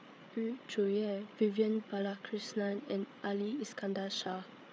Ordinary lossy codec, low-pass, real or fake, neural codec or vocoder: none; none; fake; codec, 16 kHz, 8 kbps, FreqCodec, larger model